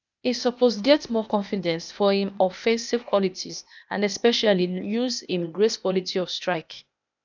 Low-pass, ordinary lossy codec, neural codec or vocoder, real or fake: 7.2 kHz; none; codec, 16 kHz, 0.8 kbps, ZipCodec; fake